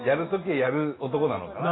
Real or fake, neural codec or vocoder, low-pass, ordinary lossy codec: real; none; 7.2 kHz; AAC, 16 kbps